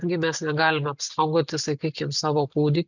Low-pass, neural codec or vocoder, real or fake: 7.2 kHz; none; real